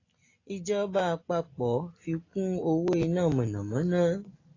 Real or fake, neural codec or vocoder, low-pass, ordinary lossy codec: real; none; 7.2 kHz; AAC, 32 kbps